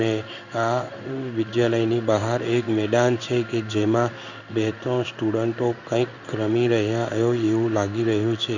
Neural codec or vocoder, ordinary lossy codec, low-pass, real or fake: codec, 16 kHz in and 24 kHz out, 1 kbps, XY-Tokenizer; none; 7.2 kHz; fake